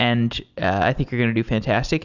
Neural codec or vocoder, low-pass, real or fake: none; 7.2 kHz; real